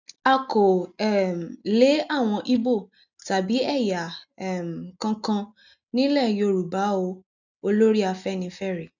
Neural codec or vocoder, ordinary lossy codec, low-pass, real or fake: none; none; 7.2 kHz; real